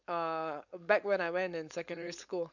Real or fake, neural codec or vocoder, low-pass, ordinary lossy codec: fake; codec, 16 kHz, 4.8 kbps, FACodec; 7.2 kHz; none